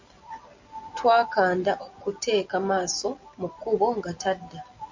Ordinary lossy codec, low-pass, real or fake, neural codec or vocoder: MP3, 64 kbps; 7.2 kHz; real; none